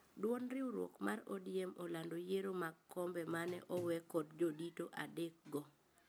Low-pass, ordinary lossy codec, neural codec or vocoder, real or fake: none; none; none; real